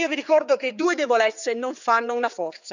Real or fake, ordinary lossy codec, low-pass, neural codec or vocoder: fake; none; 7.2 kHz; codec, 16 kHz, 4 kbps, X-Codec, HuBERT features, trained on general audio